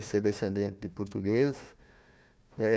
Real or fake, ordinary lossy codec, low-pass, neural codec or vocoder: fake; none; none; codec, 16 kHz, 1 kbps, FunCodec, trained on Chinese and English, 50 frames a second